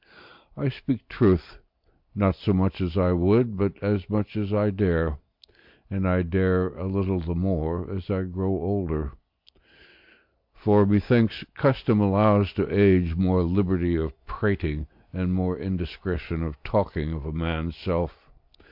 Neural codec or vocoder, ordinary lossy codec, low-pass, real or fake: none; MP3, 48 kbps; 5.4 kHz; real